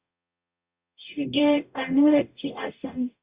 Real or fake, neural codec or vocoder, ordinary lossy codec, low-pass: fake; codec, 44.1 kHz, 0.9 kbps, DAC; Opus, 64 kbps; 3.6 kHz